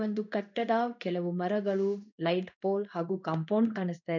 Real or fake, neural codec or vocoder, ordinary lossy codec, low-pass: fake; codec, 16 kHz in and 24 kHz out, 1 kbps, XY-Tokenizer; none; 7.2 kHz